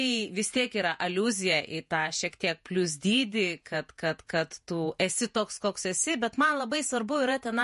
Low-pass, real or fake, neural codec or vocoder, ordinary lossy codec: 14.4 kHz; fake; vocoder, 48 kHz, 128 mel bands, Vocos; MP3, 48 kbps